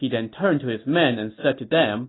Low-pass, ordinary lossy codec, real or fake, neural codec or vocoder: 7.2 kHz; AAC, 16 kbps; real; none